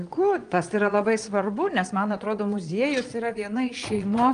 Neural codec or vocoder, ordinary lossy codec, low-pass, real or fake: vocoder, 22.05 kHz, 80 mel bands, Vocos; Opus, 32 kbps; 9.9 kHz; fake